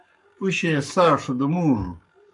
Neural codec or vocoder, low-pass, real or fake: codec, 44.1 kHz, 7.8 kbps, Pupu-Codec; 10.8 kHz; fake